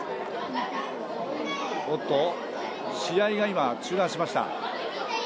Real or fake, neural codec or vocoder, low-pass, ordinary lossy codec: real; none; none; none